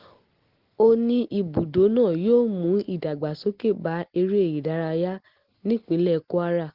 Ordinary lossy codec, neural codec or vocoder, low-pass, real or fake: Opus, 16 kbps; none; 5.4 kHz; real